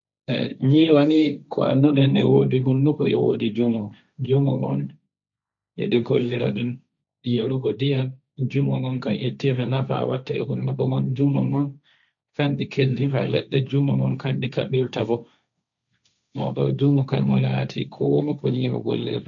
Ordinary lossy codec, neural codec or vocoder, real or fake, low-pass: none; codec, 16 kHz, 1.1 kbps, Voila-Tokenizer; fake; 7.2 kHz